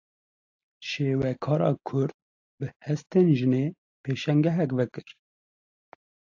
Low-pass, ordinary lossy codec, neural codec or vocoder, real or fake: 7.2 kHz; AAC, 48 kbps; none; real